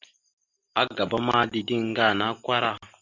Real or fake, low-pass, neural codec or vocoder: real; 7.2 kHz; none